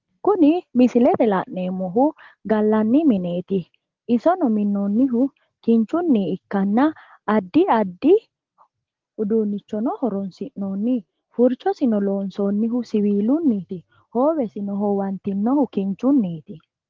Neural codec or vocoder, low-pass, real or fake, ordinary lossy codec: none; 7.2 kHz; real; Opus, 16 kbps